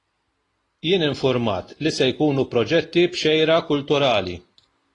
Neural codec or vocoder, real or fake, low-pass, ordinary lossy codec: none; real; 10.8 kHz; AAC, 32 kbps